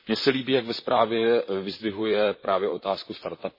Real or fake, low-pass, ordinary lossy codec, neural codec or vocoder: fake; 5.4 kHz; MP3, 24 kbps; vocoder, 44.1 kHz, 128 mel bands, Pupu-Vocoder